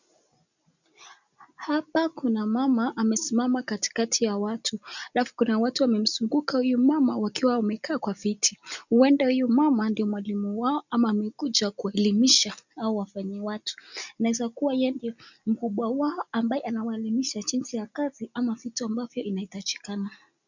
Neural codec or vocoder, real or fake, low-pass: none; real; 7.2 kHz